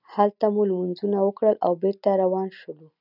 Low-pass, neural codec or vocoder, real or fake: 5.4 kHz; none; real